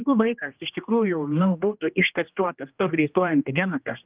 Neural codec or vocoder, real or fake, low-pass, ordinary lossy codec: codec, 16 kHz, 1 kbps, X-Codec, HuBERT features, trained on general audio; fake; 3.6 kHz; Opus, 24 kbps